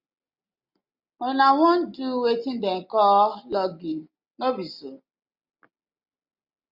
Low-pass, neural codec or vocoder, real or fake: 5.4 kHz; none; real